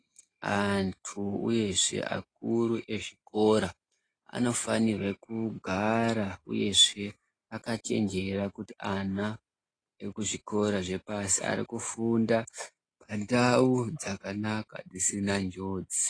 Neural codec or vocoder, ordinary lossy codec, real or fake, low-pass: none; AAC, 32 kbps; real; 9.9 kHz